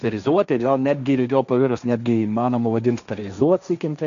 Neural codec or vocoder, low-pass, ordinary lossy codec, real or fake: codec, 16 kHz, 1.1 kbps, Voila-Tokenizer; 7.2 kHz; MP3, 96 kbps; fake